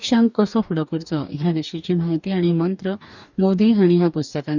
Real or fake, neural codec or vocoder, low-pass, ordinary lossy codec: fake; codec, 44.1 kHz, 2.6 kbps, DAC; 7.2 kHz; none